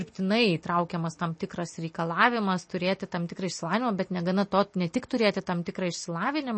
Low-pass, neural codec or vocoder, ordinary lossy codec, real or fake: 9.9 kHz; none; MP3, 32 kbps; real